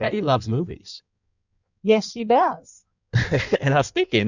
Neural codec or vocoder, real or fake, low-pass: codec, 16 kHz in and 24 kHz out, 1.1 kbps, FireRedTTS-2 codec; fake; 7.2 kHz